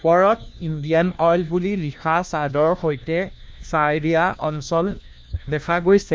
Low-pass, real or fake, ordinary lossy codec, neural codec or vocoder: none; fake; none; codec, 16 kHz, 1 kbps, FunCodec, trained on LibriTTS, 50 frames a second